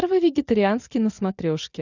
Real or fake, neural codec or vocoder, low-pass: real; none; 7.2 kHz